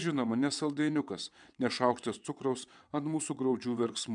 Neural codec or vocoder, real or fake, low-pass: vocoder, 22.05 kHz, 80 mel bands, WaveNeXt; fake; 9.9 kHz